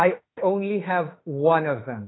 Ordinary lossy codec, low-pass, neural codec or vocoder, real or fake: AAC, 16 kbps; 7.2 kHz; autoencoder, 48 kHz, 32 numbers a frame, DAC-VAE, trained on Japanese speech; fake